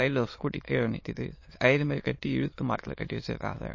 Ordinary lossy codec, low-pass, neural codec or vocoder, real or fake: MP3, 32 kbps; 7.2 kHz; autoencoder, 22.05 kHz, a latent of 192 numbers a frame, VITS, trained on many speakers; fake